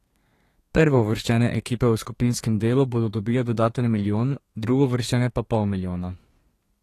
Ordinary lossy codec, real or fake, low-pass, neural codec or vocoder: AAC, 48 kbps; fake; 14.4 kHz; codec, 32 kHz, 1.9 kbps, SNAC